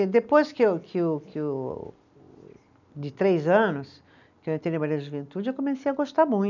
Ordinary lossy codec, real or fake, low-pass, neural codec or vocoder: none; real; 7.2 kHz; none